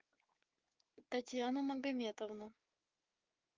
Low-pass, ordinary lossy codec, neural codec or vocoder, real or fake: 7.2 kHz; Opus, 32 kbps; codec, 16 kHz, 6 kbps, DAC; fake